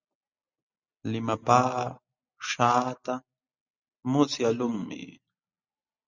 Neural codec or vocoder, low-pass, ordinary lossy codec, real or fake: none; 7.2 kHz; Opus, 64 kbps; real